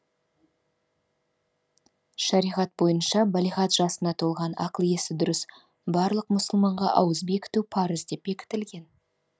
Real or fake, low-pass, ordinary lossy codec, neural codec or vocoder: real; none; none; none